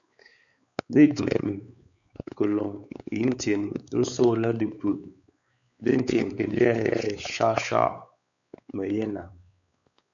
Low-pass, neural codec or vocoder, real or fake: 7.2 kHz; codec, 16 kHz, 4 kbps, X-Codec, WavLM features, trained on Multilingual LibriSpeech; fake